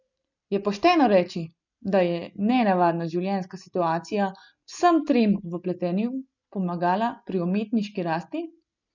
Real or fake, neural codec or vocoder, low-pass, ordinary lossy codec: real; none; 7.2 kHz; none